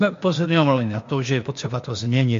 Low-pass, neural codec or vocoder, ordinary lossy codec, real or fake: 7.2 kHz; codec, 16 kHz, 0.8 kbps, ZipCodec; MP3, 48 kbps; fake